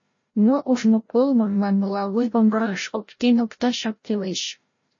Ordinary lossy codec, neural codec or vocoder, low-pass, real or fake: MP3, 32 kbps; codec, 16 kHz, 0.5 kbps, FreqCodec, larger model; 7.2 kHz; fake